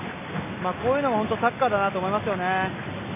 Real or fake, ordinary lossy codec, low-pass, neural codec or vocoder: real; MP3, 24 kbps; 3.6 kHz; none